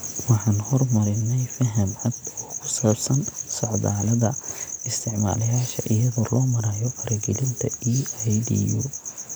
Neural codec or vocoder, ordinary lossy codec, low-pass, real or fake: none; none; none; real